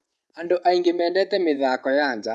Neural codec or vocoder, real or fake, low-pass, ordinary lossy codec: vocoder, 24 kHz, 100 mel bands, Vocos; fake; none; none